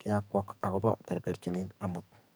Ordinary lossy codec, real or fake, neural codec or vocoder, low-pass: none; fake; codec, 44.1 kHz, 2.6 kbps, SNAC; none